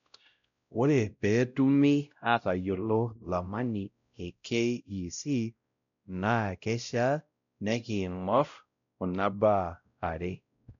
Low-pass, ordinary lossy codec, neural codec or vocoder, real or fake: 7.2 kHz; none; codec, 16 kHz, 0.5 kbps, X-Codec, WavLM features, trained on Multilingual LibriSpeech; fake